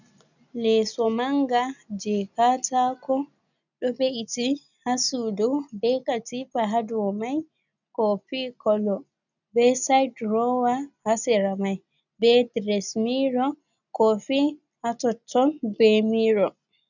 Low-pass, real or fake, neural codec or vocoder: 7.2 kHz; real; none